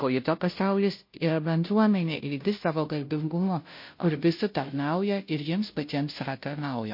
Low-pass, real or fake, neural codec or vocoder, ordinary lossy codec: 5.4 kHz; fake; codec, 16 kHz, 0.5 kbps, FunCodec, trained on Chinese and English, 25 frames a second; MP3, 32 kbps